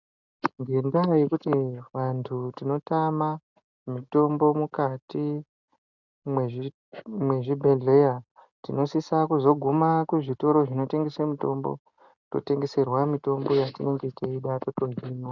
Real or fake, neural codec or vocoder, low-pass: real; none; 7.2 kHz